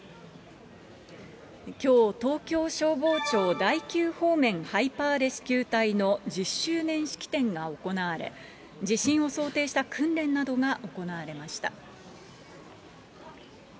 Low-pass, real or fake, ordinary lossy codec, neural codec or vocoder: none; real; none; none